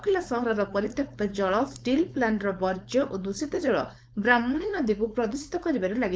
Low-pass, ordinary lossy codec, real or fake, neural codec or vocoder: none; none; fake; codec, 16 kHz, 4.8 kbps, FACodec